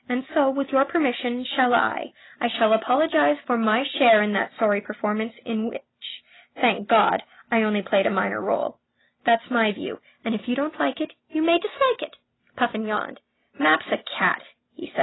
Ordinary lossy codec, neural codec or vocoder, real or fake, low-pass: AAC, 16 kbps; none; real; 7.2 kHz